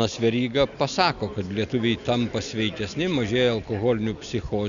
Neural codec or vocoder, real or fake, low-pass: none; real; 7.2 kHz